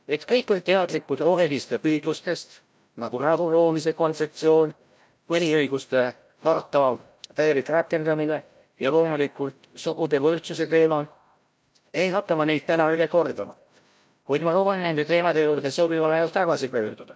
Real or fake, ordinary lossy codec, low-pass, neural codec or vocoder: fake; none; none; codec, 16 kHz, 0.5 kbps, FreqCodec, larger model